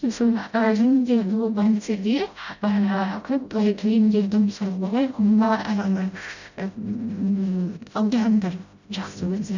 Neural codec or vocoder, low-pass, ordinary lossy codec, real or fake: codec, 16 kHz, 0.5 kbps, FreqCodec, smaller model; 7.2 kHz; none; fake